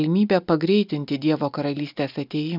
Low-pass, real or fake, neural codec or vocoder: 5.4 kHz; real; none